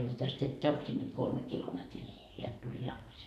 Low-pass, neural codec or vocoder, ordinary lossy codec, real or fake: 14.4 kHz; codec, 44.1 kHz, 2.6 kbps, SNAC; none; fake